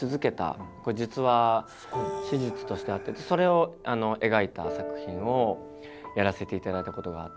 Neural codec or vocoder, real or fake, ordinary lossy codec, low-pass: none; real; none; none